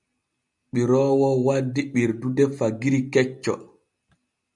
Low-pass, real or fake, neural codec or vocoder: 10.8 kHz; real; none